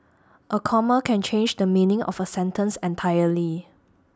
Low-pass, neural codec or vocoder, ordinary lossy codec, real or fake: none; none; none; real